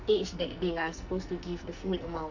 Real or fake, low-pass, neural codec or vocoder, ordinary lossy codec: fake; 7.2 kHz; codec, 44.1 kHz, 2.6 kbps, SNAC; none